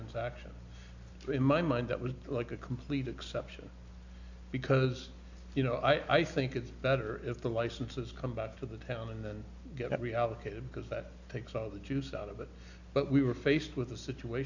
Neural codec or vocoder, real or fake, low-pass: none; real; 7.2 kHz